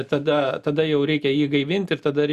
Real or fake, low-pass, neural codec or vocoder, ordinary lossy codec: real; 14.4 kHz; none; Opus, 64 kbps